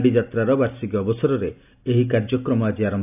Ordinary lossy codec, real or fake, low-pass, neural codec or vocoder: AAC, 32 kbps; real; 3.6 kHz; none